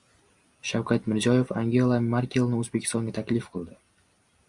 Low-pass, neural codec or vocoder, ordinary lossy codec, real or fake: 10.8 kHz; none; Opus, 64 kbps; real